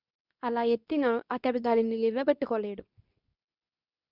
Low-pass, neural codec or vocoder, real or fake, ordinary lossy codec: 5.4 kHz; codec, 24 kHz, 0.9 kbps, WavTokenizer, medium speech release version 2; fake; none